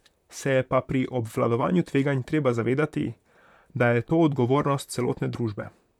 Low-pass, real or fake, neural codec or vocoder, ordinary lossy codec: 19.8 kHz; fake; vocoder, 44.1 kHz, 128 mel bands, Pupu-Vocoder; none